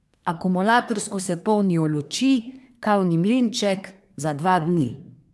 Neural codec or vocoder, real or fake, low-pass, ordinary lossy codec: codec, 24 kHz, 1 kbps, SNAC; fake; none; none